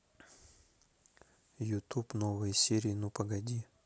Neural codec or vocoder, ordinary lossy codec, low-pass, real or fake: none; none; none; real